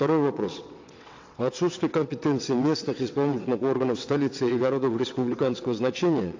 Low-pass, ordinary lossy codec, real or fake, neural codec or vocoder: 7.2 kHz; AAC, 48 kbps; fake; vocoder, 22.05 kHz, 80 mel bands, WaveNeXt